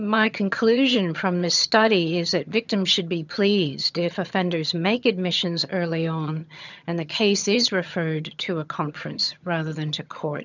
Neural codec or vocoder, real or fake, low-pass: vocoder, 22.05 kHz, 80 mel bands, HiFi-GAN; fake; 7.2 kHz